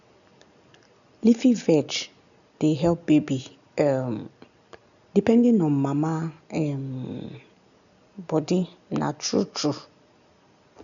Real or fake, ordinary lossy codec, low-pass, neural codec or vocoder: real; none; 7.2 kHz; none